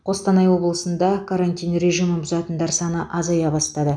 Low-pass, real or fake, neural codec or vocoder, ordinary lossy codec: 9.9 kHz; real; none; none